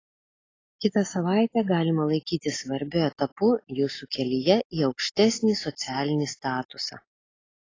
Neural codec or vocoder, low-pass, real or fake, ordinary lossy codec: none; 7.2 kHz; real; AAC, 32 kbps